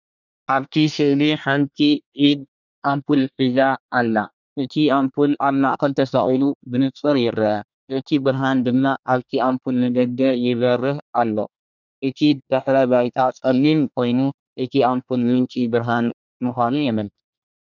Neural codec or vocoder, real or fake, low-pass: codec, 24 kHz, 1 kbps, SNAC; fake; 7.2 kHz